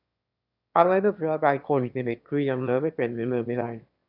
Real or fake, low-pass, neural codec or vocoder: fake; 5.4 kHz; autoencoder, 22.05 kHz, a latent of 192 numbers a frame, VITS, trained on one speaker